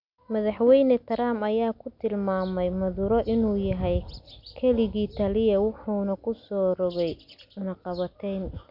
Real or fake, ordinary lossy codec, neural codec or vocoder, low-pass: real; none; none; 5.4 kHz